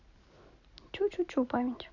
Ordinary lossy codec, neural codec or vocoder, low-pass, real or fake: none; none; 7.2 kHz; real